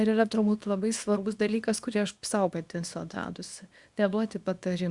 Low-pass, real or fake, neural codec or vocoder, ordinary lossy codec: 10.8 kHz; fake; codec, 24 kHz, 0.9 kbps, WavTokenizer, small release; Opus, 64 kbps